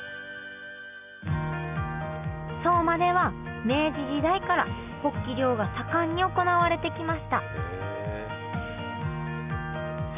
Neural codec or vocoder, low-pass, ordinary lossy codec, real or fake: none; 3.6 kHz; none; real